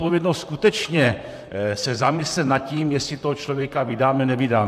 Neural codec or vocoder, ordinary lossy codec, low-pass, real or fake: vocoder, 44.1 kHz, 128 mel bands, Pupu-Vocoder; AAC, 96 kbps; 14.4 kHz; fake